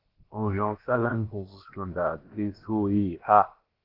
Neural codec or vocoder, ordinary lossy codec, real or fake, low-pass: codec, 16 kHz, about 1 kbps, DyCAST, with the encoder's durations; Opus, 16 kbps; fake; 5.4 kHz